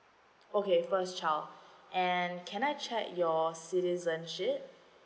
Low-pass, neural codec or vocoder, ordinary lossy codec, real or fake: none; none; none; real